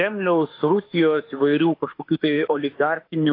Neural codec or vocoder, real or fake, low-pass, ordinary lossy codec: autoencoder, 48 kHz, 32 numbers a frame, DAC-VAE, trained on Japanese speech; fake; 5.4 kHz; AAC, 32 kbps